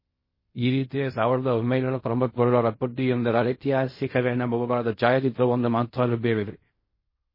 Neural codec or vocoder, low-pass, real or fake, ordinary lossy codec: codec, 16 kHz in and 24 kHz out, 0.4 kbps, LongCat-Audio-Codec, fine tuned four codebook decoder; 5.4 kHz; fake; MP3, 24 kbps